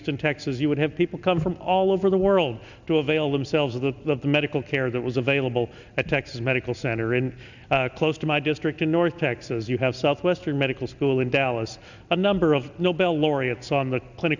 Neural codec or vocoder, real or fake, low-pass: none; real; 7.2 kHz